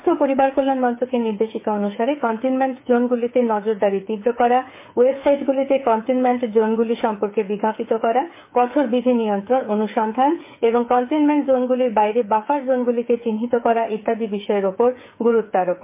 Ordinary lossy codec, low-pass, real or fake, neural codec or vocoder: MP3, 24 kbps; 3.6 kHz; fake; codec, 16 kHz, 8 kbps, FreqCodec, smaller model